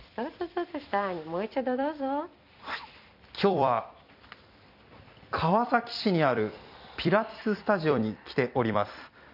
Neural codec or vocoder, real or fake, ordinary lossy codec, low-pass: vocoder, 44.1 kHz, 128 mel bands every 256 samples, BigVGAN v2; fake; none; 5.4 kHz